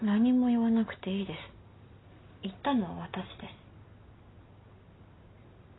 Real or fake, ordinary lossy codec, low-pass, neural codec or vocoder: real; AAC, 16 kbps; 7.2 kHz; none